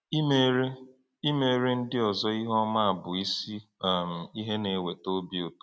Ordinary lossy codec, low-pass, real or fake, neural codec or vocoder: none; none; real; none